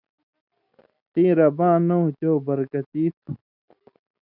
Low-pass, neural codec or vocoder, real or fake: 5.4 kHz; none; real